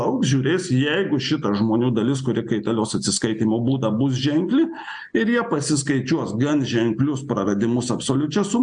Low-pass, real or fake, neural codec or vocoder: 9.9 kHz; real; none